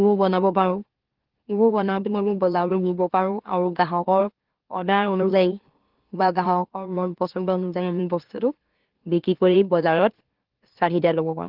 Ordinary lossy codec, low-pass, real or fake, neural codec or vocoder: Opus, 16 kbps; 5.4 kHz; fake; autoencoder, 44.1 kHz, a latent of 192 numbers a frame, MeloTTS